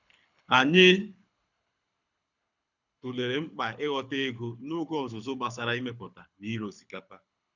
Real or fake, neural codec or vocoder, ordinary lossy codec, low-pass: fake; codec, 24 kHz, 6 kbps, HILCodec; none; 7.2 kHz